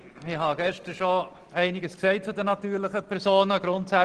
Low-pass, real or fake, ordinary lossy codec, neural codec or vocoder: 9.9 kHz; real; Opus, 16 kbps; none